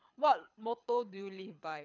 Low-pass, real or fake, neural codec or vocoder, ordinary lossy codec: 7.2 kHz; fake; codec, 24 kHz, 6 kbps, HILCodec; none